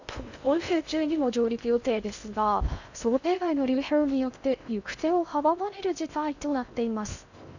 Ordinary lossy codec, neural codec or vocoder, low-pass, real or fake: none; codec, 16 kHz in and 24 kHz out, 0.6 kbps, FocalCodec, streaming, 2048 codes; 7.2 kHz; fake